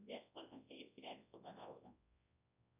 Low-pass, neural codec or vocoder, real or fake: 3.6 kHz; codec, 24 kHz, 0.9 kbps, WavTokenizer, large speech release; fake